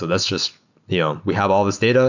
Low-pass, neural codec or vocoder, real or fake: 7.2 kHz; none; real